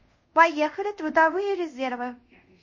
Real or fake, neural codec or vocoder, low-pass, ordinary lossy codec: fake; codec, 24 kHz, 0.5 kbps, DualCodec; 7.2 kHz; MP3, 32 kbps